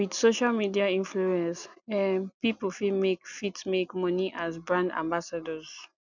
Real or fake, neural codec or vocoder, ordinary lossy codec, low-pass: real; none; none; 7.2 kHz